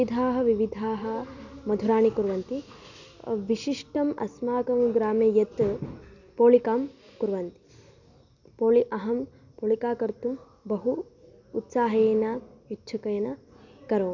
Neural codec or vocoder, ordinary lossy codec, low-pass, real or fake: none; none; 7.2 kHz; real